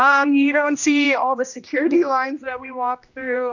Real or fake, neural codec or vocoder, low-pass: fake; codec, 16 kHz, 1 kbps, X-Codec, HuBERT features, trained on general audio; 7.2 kHz